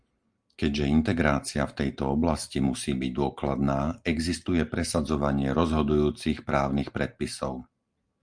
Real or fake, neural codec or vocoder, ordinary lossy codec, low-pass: real; none; Opus, 32 kbps; 9.9 kHz